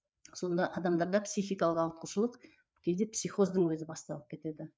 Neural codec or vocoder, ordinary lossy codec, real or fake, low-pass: codec, 16 kHz, 4 kbps, FreqCodec, larger model; none; fake; none